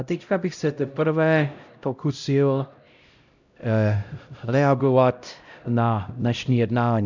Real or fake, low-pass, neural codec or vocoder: fake; 7.2 kHz; codec, 16 kHz, 0.5 kbps, X-Codec, HuBERT features, trained on LibriSpeech